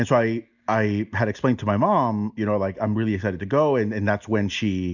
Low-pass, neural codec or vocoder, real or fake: 7.2 kHz; none; real